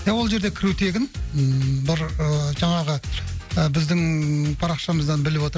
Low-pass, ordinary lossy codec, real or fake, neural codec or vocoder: none; none; real; none